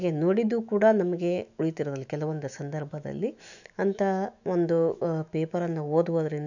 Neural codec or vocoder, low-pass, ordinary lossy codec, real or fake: autoencoder, 48 kHz, 128 numbers a frame, DAC-VAE, trained on Japanese speech; 7.2 kHz; none; fake